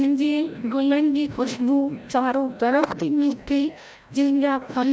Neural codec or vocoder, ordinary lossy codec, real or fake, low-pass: codec, 16 kHz, 0.5 kbps, FreqCodec, larger model; none; fake; none